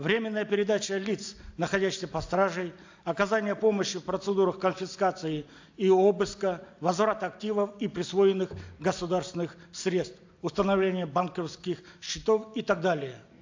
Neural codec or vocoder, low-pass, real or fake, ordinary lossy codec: none; 7.2 kHz; real; AAC, 48 kbps